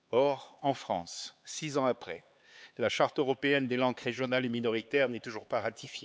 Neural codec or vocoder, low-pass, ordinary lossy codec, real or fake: codec, 16 kHz, 4 kbps, X-Codec, HuBERT features, trained on LibriSpeech; none; none; fake